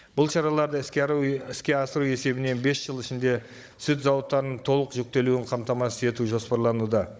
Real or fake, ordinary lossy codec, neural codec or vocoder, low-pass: fake; none; codec, 16 kHz, 16 kbps, FunCodec, trained on Chinese and English, 50 frames a second; none